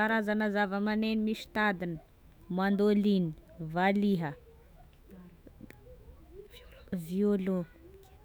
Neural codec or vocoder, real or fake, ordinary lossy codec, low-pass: autoencoder, 48 kHz, 128 numbers a frame, DAC-VAE, trained on Japanese speech; fake; none; none